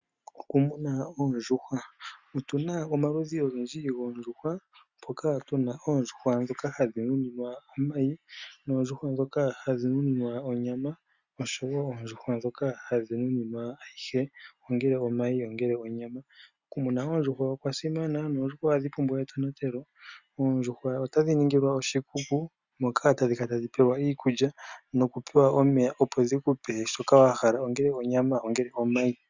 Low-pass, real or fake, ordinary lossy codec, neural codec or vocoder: 7.2 kHz; real; Opus, 64 kbps; none